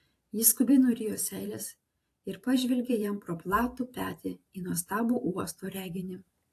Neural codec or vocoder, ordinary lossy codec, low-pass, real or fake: vocoder, 44.1 kHz, 128 mel bands every 512 samples, BigVGAN v2; AAC, 64 kbps; 14.4 kHz; fake